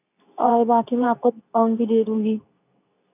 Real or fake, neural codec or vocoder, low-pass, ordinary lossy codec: fake; codec, 24 kHz, 0.9 kbps, WavTokenizer, medium speech release version 2; 3.6 kHz; none